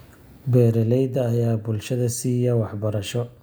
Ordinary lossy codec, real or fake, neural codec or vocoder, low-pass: none; real; none; none